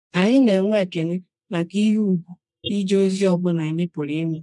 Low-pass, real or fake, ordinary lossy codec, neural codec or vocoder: 10.8 kHz; fake; none; codec, 24 kHz, 0.9 kbps, WavTokenizer, medium music audio release